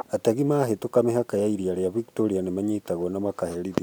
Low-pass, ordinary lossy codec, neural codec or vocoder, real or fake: none; none; none; real